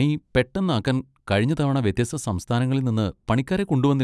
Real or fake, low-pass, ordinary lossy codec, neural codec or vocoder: real; none; none; none